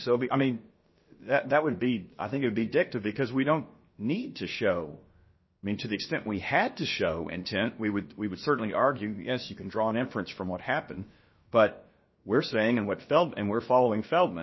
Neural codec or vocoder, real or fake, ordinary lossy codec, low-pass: codec, 16 kHz, about 1 kbps, DyCAST, with the encoder's durations; fake; MP3, 24 kbps; 7.2 kHz